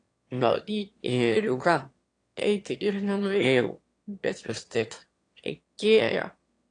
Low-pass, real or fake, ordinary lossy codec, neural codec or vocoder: 9.9 kHz; fake; AAC, 48 kbps; autoencoder, 22.05 kHz, a latent of 192 numbers a frame, VITS, trained on one speaker